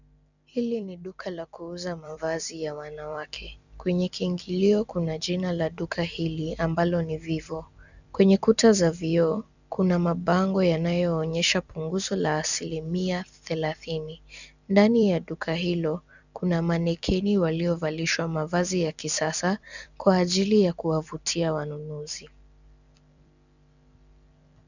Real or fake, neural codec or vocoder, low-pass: fake; vocoder, 44.1 kHz, 128 mel bands every 256 samples, BigVGAN v2; 7.2 kHz